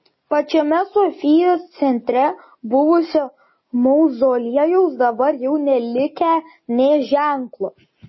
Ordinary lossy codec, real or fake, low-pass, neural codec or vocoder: MP3, 24 kbps; real; 7.2 kHz; none